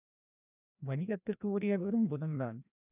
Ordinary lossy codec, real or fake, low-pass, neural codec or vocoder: none; fake; 3.6 kHz; codec, 16 kHz, 1 kbps, FreqCodec, larger model